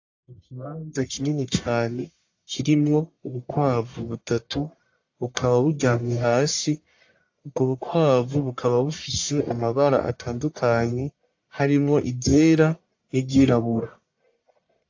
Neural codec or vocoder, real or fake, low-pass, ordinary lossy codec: codec, 44.1 kHz, 1.7 kbps, Pupu-Codec; fake; 7.2 kHz; AAC, 48 kbps